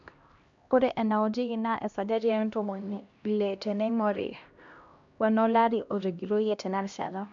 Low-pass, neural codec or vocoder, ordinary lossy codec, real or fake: 7.2 kHz; codec, 16 kHz, 1 kbps, X-Codec, HuBERT features, trained on LibriSpeech; MP3, 64 kbps; fake